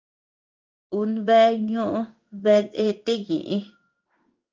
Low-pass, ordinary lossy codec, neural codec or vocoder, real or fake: 7.2 kHz; Opus, 24 kbps; codec, 16 kHz in and 24 kHz out, 1 kbps, XY-Tokenizer; fake